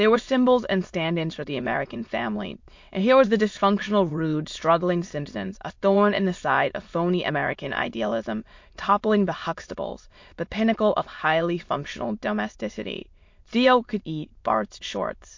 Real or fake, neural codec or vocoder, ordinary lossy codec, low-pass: fake; autoencoder, 22.05 kHz, a latent of 192 numbers a frame, VITS, trained on many speakers; MP3, 48 kbps; 7.2 kHz